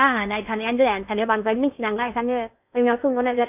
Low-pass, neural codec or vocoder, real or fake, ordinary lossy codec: 3.6 kHz; codec, 16 kHz in and 24 kHz out, 0.6 kbps, FocalCodec, streaming, 4096 codes; fake; none